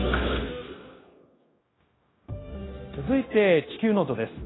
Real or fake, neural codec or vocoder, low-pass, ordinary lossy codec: fake; codec, 16 kHz, 0.9 kbps, LongCat-Audio-Codec; 7.2 kHz; AAC, 16 kbps